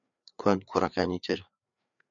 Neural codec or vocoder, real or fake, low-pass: codec, 16 kHz, 4 kbps, FreqCodec, larger model; fake; 7.2 kHz